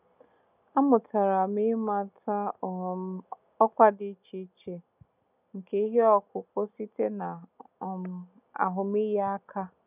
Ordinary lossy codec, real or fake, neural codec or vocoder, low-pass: none; real; none; 3.6 kHz